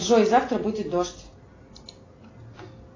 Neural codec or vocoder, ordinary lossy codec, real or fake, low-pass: none; AAC, 32 kbps; real; 7.2 kHz